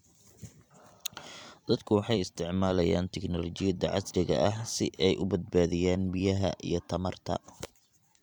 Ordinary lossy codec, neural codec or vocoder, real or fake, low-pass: none; vocoder, 44.1 kHz, 128 mel bands every 256 samples, BigVGAN v2; fake; 19.8 kHz